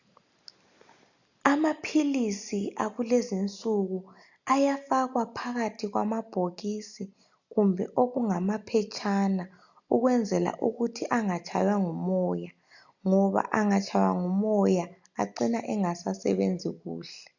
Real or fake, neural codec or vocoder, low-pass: real; none; 7.2 kHz